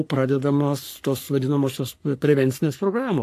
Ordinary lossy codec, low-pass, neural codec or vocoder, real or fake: AAC, 64 kbps; 14.4 kHz; codec, 44.1 kHz, 3.4 kbps, Pupu-Codec; fake